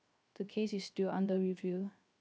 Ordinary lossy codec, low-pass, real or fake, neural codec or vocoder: none; none; fake; codec, 16 kHz, 0.3 kbps, FocalCodec